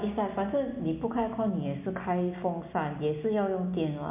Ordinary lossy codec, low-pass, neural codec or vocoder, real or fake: MP3, 32 kbps; 3.6 kHz; none; real